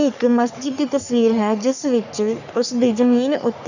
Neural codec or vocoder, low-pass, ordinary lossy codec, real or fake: codec, 44.1 kHz, 3.4 kbps, Pupu-Codec; 7.2 kHz; none; fake